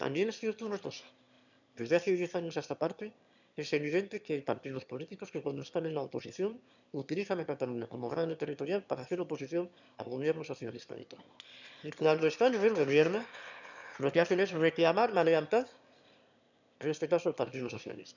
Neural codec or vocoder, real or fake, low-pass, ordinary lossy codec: autoencoder, 22.05 kHz, a latent of 192 numbers a frame, VITS, trained on one speaker; fake; 7.2 kHz; none